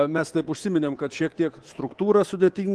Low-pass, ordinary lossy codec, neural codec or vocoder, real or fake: 10.8 kHz; Opus, 16 kbps; codec, 24 kHz, 3.1 kbps, DualCodec; fake